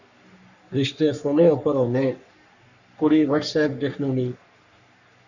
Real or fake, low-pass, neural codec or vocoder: fake; 7.2 kHz; codec, 44.1 kHz, 3.4 kbps, Pupu-Codec